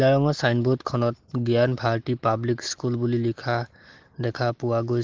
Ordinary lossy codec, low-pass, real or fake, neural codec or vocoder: Opus, 16 kbps; 7.2 kHz; real; none